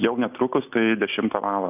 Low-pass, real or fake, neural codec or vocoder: 3.6 kHz; real; none